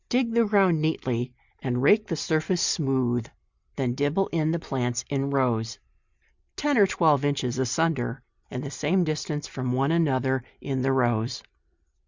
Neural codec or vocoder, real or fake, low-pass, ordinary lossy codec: none; real; 7.2 kHz; Opus, 64 kbps